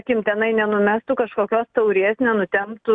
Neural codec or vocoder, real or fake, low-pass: none; real; 9.9 kHz